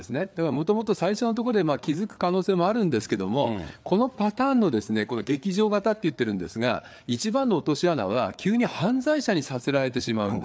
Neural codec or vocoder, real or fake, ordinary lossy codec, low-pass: codec, 16 kHz, 4 kbps, FreqCodec, larger model; fake; none; none